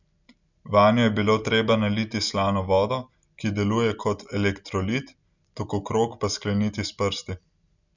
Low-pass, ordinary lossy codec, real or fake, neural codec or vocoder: 7.2 kHz; none; real; none